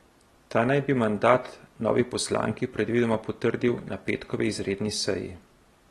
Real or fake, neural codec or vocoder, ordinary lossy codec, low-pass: real; none; AAC, 32 kbps; 14.4 kHz